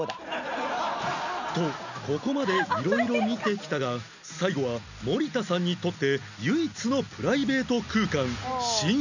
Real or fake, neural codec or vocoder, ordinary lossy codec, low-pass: real; none; none; 7.2 kHz